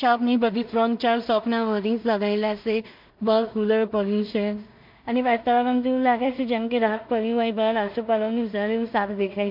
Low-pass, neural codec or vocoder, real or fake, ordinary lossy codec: 5.4 kHz; codec, 16 kHz in and 24 kHz out, 0.4 kbps, LongCat-Audio-Codec, two codebook decoder; fake; MP3, 48 kbps